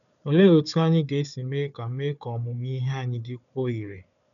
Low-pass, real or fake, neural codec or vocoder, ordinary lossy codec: 7.2 kHz; fake; codec, 16 kHz, 4 kbps, FunCodec, trained on Chinese and English, 50 frames a second; none